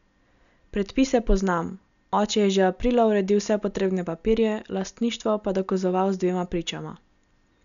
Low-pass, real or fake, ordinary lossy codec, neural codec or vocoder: 7.2 kHz; real; none; none